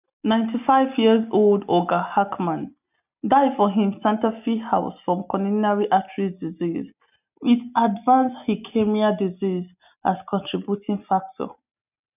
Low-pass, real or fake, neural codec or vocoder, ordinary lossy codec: 3.6 kHz; real; none; none